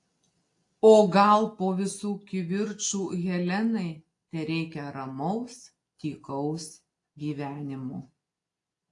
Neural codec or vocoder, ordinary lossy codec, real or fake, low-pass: none; AAC, 48 kbps; real; 10.8 kHz